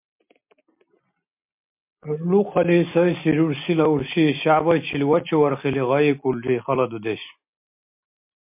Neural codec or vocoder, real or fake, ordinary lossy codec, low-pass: none; real; MP3, 32 kbps; 3.6 kHz